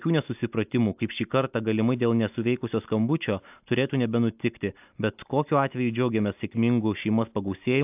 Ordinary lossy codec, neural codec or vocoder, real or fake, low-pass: AAC, 32 kbps; none; real; 3.6 kHz